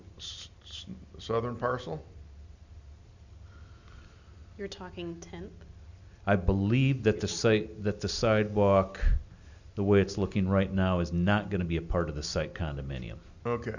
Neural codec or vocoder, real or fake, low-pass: none; real; 7.2 kHz